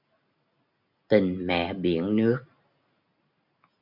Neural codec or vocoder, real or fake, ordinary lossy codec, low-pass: none; real; MP3, 48 kbps; 5.4 kHz